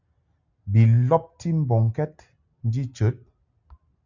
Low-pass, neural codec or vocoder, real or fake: 7.2 kHz; none; real